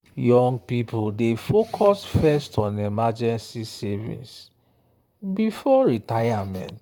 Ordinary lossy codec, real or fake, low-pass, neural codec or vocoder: none; fake; 19.8 kHz; vocoder, 44.1 kHz, 128 mel bands, Pupu-Vocoder